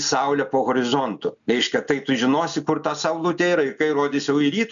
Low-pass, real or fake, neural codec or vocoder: 7.2 kHz; real; none